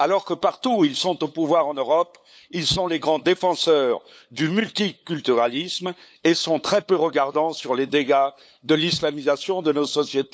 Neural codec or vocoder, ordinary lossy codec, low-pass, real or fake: codec, 16 kHz, 8 kbps, FunCodec, trained on LibriTTS, 25 frames a second; none; none; fake